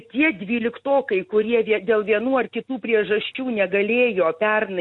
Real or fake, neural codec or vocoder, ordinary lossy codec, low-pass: real; none; MP3, 48 kbps; 10.8 kHz